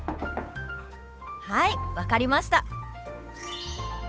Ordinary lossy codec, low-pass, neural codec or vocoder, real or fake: none; none; codec, 16 kHz, 8 kbps, FunCodec, trained on Chinese and English, 25 frames a second; fake